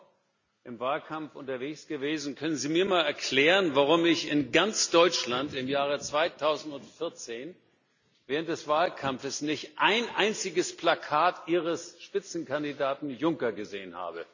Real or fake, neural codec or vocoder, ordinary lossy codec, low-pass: real; none; none; 7.2 kHz